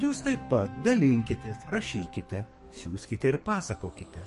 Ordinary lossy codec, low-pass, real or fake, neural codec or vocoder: MP3, 48 kbps; 14.4 kHz; fake; codec, 44.1 kHz, 2.6 kbps, SNAC